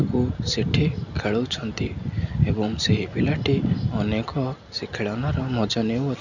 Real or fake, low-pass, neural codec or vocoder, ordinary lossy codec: real; 7.2 kHz; none; none